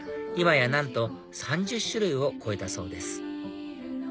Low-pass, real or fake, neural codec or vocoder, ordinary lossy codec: none; real; none; none